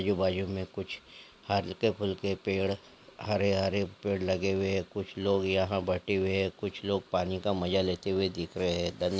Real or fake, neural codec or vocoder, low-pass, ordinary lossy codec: real; none; none; none